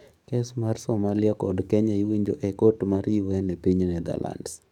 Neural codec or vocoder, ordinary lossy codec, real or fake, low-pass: codec, 44.1 kHz, 7.8 kbps, DAC; none; fake; 19.8 kHz